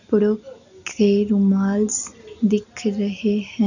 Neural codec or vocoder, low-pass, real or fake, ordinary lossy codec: none; 7.2 kHz; real; none